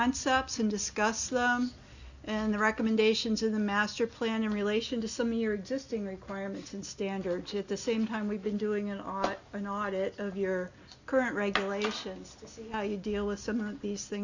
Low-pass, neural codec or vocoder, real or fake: 7.2 kHz; none; real